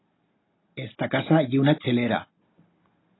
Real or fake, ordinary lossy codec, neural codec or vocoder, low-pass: real; AAC, 16 kbps; none; 7.2 kHz